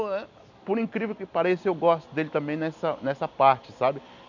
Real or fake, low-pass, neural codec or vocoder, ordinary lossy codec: real; 7.2 kHz; none; none